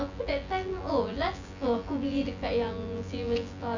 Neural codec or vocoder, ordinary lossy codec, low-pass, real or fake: vocoder, 24 kHz, 100 mel bands, Vocos; none; 7.2 kHz; fake